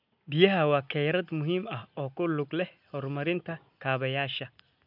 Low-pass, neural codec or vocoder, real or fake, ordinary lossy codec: 5.4 kHz; none; real; none